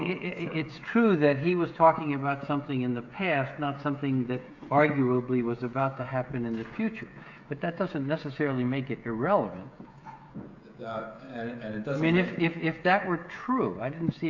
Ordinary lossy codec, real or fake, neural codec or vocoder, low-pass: AAC, 48 kbps; fake; codec, 16 kHz, 16 kbps, FreqCodec, smaller model; 7.2 kHz